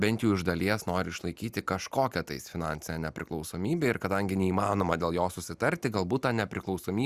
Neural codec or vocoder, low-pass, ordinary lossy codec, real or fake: none; 14.4 kHz; Opus, 64 kbps; real